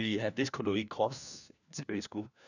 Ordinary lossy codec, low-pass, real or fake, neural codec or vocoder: none; 7.2 kHz; fake; codec, 16 kHz, 1 kbps, FunCodec, trained on LibriTTS, 50 frames a second